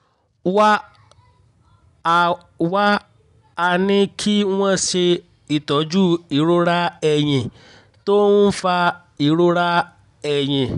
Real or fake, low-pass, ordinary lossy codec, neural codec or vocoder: real; 10.8 kHz; none; none